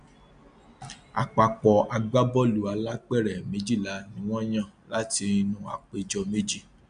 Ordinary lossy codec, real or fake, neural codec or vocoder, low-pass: MP3, 96 kbps; real; none; 9.9 kHz